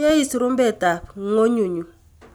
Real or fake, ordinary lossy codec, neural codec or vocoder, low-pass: real; none; none; none